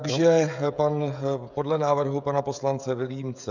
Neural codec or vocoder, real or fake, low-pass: codec, 16 kHz, 16 kbps, FreqCodec, smaller model; fake; 7.2 kHz